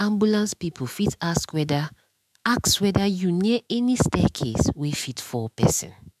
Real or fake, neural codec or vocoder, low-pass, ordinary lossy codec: fake; autoencoder, 48 kHz, 128 numbers a frame, DAC-VAE, trained on Japanese speech; 14.4 kHz; MP3, 96 kbps